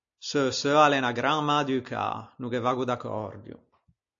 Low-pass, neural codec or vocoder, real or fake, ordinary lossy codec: 7.2 kHz; none; real; AAC, 64 kbps